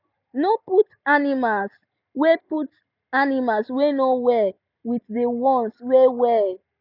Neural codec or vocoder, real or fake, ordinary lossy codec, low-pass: none; real; none; 5.4 kHz